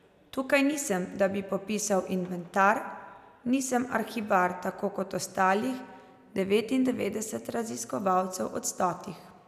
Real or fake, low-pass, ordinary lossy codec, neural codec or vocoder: real; 14.4 kHz; none; none